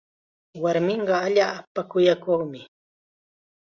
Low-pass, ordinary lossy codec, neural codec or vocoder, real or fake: 7.2 kHz; Opus, 64 kbps; none; real